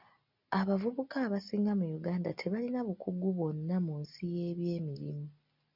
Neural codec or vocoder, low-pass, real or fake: none; 5.4 kHz; real